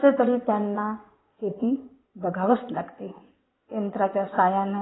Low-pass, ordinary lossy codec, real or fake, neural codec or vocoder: 7.2 kHz; AAC, 16 kbps; fake; codec, 16 kHz in and 24 kHz out, 2.2 kbps, FireRedTTS-2 codec